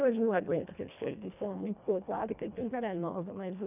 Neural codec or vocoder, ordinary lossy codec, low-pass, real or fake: codec, 24 kHz, 1.5 kbps, HILCodec; none; 3.6 kHz; fake